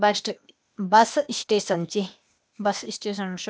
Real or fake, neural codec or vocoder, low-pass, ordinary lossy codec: fake; codec, 16 kHz, 0.8 kbps, ZipCodec; none; none